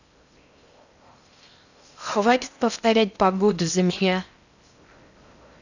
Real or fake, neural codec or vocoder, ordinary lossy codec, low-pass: fake; codec, 16 kHz in and 24 kHz out, 0.6 kbps, FocalCodec, streaming, 4096 codes; none; 7.2 kHz